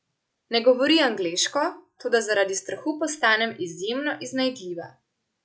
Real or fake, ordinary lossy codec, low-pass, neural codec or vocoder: real; none; none; none